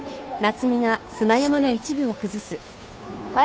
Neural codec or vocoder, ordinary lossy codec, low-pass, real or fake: codec, 16 kHz, 2 kbps, FunCodec, trained on Chinese and English, 25 frames a second; none; none; fake